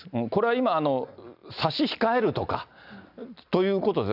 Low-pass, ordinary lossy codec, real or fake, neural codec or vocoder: 5.4 kHz; none; real; none